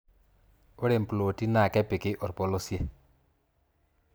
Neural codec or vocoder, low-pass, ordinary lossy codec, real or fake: vocoder, 44.1 kHz, 128 mel bands every 256 samples, BigVGAN v2; none; none; fake